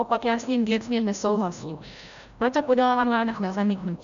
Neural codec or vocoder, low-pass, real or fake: codec, 16 kHz, 0.5 kbps, FreqCodec, larger model; 7.2 kHz; fake